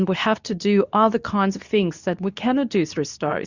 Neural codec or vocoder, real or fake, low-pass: codec, 24 kHz, 0.9 kbps, WavTokenizer, medium speech release version 2; fake; 7.2 kHz